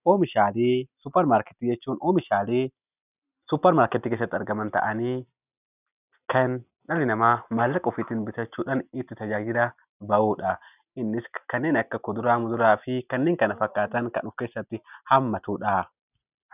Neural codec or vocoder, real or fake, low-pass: none; real; 3.6 kHz